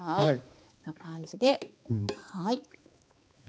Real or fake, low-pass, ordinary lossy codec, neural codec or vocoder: fake; none; none; codec, 16 kHz, 2 kbps, X-Codec, HuBERT features, trained on balanced general audio